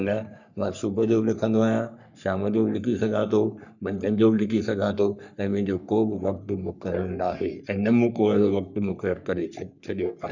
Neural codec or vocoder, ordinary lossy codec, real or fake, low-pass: codec, 44.1 kHz, 3.4 kbps, Pupu-Codec; none; fake; 7.2 kHz